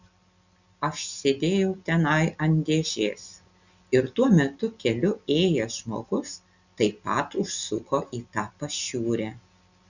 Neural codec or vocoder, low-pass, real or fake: none; 7.2 kHz; real